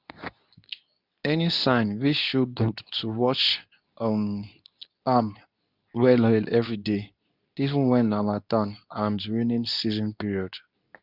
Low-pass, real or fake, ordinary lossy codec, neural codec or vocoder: 5.4 kHz; fake; none; codec, 24 kHz, 0.9 kbps, WavTokenizer, medium speech release version 2